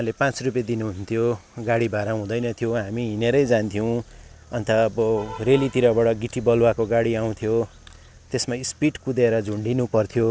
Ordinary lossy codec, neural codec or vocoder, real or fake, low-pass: none; none; real; none